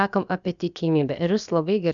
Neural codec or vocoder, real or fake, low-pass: codec, 16 kHz, about 1 kbps, DyCAST, with the encoder's durations; fake; 7.2 kHz